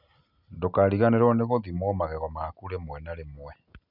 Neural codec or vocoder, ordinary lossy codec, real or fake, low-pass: none; none; real; 5.4 kHz